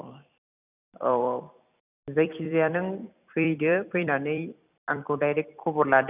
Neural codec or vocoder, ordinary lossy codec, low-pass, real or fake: vocoder, 44.1 kHz, 128 mel bands every 256 samples, BigVGAN v2; none; 3.6 kHz; fake